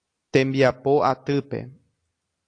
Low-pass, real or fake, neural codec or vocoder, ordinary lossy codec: 9.9 kHz; real; none; AAC, 48 kbps